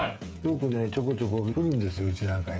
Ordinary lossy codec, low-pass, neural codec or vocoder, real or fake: none; none; codec, 16 kHz, 16 kbps, FreqCodec, smaller model; fake